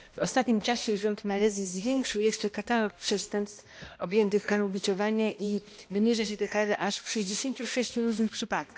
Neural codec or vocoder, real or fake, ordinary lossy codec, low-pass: codec, 16 kHz, 1 kbps, X-Codec, HuBERT features, trained on balanced general audio; fake; none; none